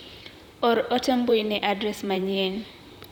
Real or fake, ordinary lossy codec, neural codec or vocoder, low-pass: fake; none; vocoder, 44.1 kHz, 128 mel bands, Pupu-Vocoder; 19.8 kHz